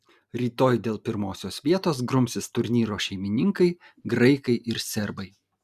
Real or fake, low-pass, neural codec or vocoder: real; 14.4 kHz; none